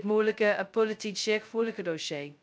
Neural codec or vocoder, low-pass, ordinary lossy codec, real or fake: codec, 16 kHz, 0.2 kbps, FocalCodec; none; none; fake